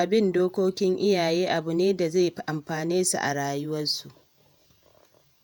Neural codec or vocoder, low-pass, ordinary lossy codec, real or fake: vocoder, 48 kHz, 128 mel bands, Vocos; none; none; fake